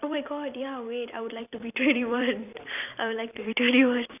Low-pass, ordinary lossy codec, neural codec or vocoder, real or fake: 3.6 kHz; none; vocoder, 44.1 kHz, 128 mel bands every 256 samples, BigVGAN v2; fake